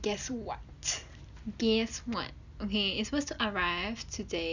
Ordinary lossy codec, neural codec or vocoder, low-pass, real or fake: none; none; 7.2 kHz; real